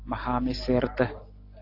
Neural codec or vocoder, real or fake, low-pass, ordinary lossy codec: none; real; 5.4 kHz; AAC, 32 kbps